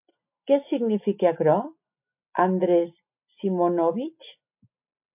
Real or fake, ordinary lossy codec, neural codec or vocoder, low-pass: real; MP3, 32 kbps; none; 3.6 kHz